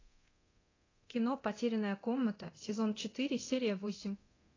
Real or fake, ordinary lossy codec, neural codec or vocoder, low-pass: fake; AAC, 32 kbps; codec, 24 kHz, 0.9 kbps, DualCodec; 7.2 kHz